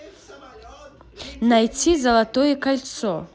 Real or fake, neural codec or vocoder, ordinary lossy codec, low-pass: real; none; none; none